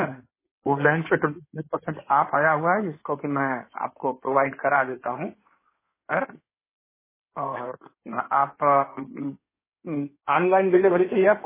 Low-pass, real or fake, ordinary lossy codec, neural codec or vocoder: 3.6 kHz; fake; MP3, 16 kbps; codec, 16 kHz in and 24 kHz out, 2.2 kbps, FireRedTTS-2 codec